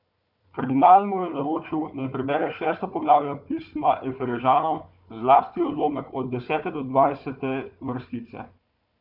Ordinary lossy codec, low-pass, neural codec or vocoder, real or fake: none; 5.4 kHz; codec, 16 kHz, 4 kbps, FunCodec, trained on Chinese and English, 50 frames a second; fake